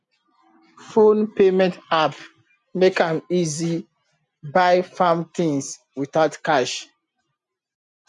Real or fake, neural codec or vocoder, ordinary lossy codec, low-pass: real; none; none; 10.8 kHz